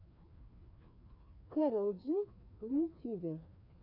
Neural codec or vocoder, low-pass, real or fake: codec, 16 kHz, 2 kbps, FreqCodec, larger model; 5.4 kHz; fake